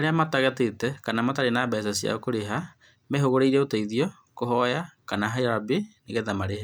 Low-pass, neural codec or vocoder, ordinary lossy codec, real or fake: none; none; none; real